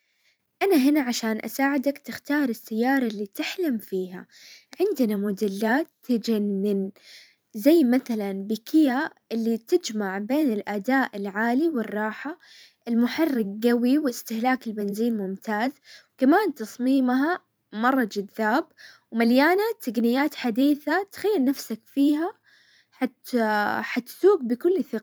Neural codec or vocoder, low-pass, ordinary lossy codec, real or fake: vocoder, 44.1 kHz, 128 mel bands every 512 samples, BigVGAN v2; none; none; fake